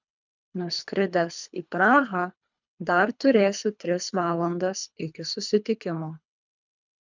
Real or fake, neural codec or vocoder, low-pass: fake; codec, 24 kHz, 3 kbps, HILCodec; 7.2 kHz